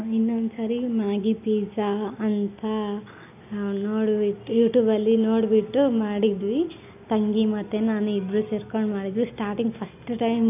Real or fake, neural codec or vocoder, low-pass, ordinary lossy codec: real; none; 3.6 kHz; AAC, 32 kbps